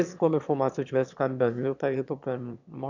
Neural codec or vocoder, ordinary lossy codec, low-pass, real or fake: autoencoder, 22.05 kHz, a latent of 192 numbers a frame, VITS, trained on one speaker; none; 7.2 kHz; fake